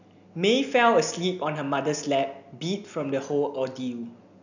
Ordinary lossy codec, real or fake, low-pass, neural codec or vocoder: none; real; 7.2 kHz; none